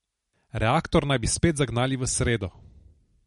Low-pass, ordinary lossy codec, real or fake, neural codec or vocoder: 19.8 kHz; MP3, 48 kbps; real; none